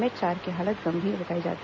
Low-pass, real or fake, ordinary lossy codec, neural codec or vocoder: none; real; none; none